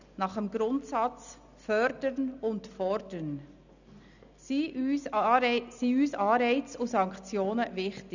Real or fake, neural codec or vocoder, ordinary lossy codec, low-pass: real; none; none; 7.2 kHz